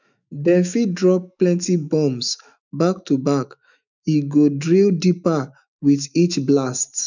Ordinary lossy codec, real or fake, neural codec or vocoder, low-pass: none; fake; autoencoder, 48 kHz, 128 numbers a frame, DAC-VAE, trained on Japanese speech; 7.2 kHz